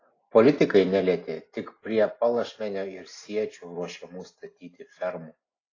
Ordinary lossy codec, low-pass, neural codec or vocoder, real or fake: AAC, 32 kbps; 7.2 kHz; none; real